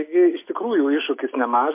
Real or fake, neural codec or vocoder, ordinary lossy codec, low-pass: real; none; MP3, 24 kbps; 3.6 kHz